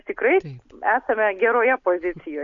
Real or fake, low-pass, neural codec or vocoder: real; 7.2 kHz; none